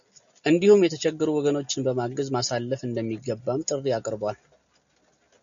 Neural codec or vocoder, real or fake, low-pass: none; real; 7.2 kHz